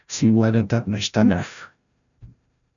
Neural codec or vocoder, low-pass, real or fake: codec, 16 kHz, 0.5 kbps, FreqCodec, larger model; 7.2 kHz; fake